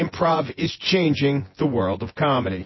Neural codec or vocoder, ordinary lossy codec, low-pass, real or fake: vocoder, 24 kHz, 100 mel bands, Vocos; MP3, 24 kbps; 7.2 kHz; fake